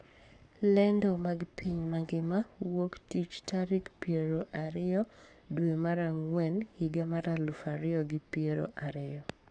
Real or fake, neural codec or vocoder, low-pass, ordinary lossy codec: fake; codec, 44.1 kHz, 7.8 kbps, Pupu-Codec; 9.9 kHz; none